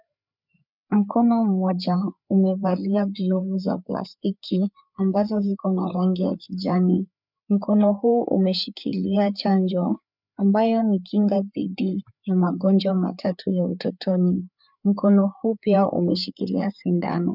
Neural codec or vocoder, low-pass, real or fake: codec, 16 kHz, 4 kbps, FreqCodec, larger model; 5.4 kHz; fake